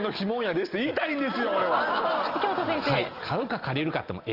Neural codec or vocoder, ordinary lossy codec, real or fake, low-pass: none; Opus, 24 kbps; real; 5.4 kHz